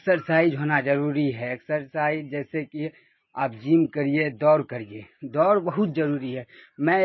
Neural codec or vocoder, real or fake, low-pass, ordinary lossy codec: none; real; 7.2 kHz; MP3, 24 kbps